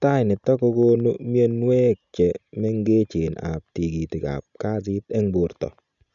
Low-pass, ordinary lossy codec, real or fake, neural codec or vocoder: 7.2 kHz; none; real; none